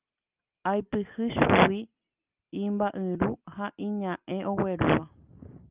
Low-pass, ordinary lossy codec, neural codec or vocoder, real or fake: 3.6 kHz; Opus, 24 kbps; none; real